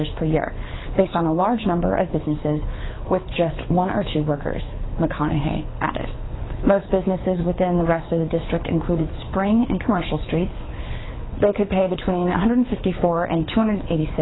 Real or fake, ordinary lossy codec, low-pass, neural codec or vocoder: fake; AAC, 16 kbps; 7.2 kHz; codec, 24 kHz, 6 kbps, HILCodec